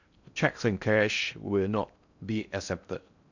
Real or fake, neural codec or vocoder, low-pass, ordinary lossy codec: fake; codec, 16 kHz in and 24 kHz out, 0.6 kbps, FocalCodec, streaming, 2048 codes; 7.2 kHz; none